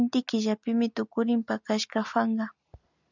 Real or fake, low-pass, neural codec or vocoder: real; 7.2 kHz; none